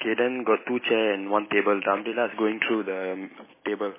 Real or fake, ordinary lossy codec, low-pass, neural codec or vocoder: real; MP3, 16 kbps; 3.6 kHz; none